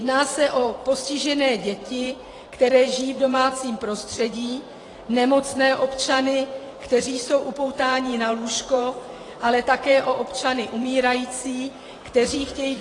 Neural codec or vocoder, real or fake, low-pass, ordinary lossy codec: vocoder, 44.1 kHz, 128 mel bands every 512 samples, BigVGAN v2; fake; 10.8 kHz; AAC, 32 kbps